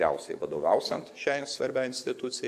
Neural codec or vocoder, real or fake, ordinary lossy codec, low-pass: codec, 44.1 kHz, 7.8 kbps, DAC; fake; MP3, 96 kbps; 14.4 kHz